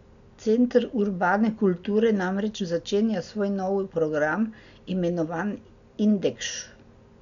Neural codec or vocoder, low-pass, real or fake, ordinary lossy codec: none; 7.2 kHz; real; none